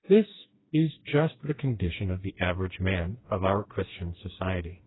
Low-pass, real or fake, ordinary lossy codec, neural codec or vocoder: 7.2 kHz; fake; AAC, 16 kbps; codec, 16 kHz, 2 kbps, FreqCodec, smaller model